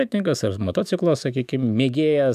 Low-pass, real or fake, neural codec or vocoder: 14.4 kHz; fake; autoencoder, 48 kHz, 128 numbers a frame, DAC-VAE, trained on Japanese speech